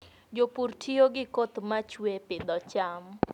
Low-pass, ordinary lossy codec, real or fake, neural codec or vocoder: 19.8 kHz; none; real; none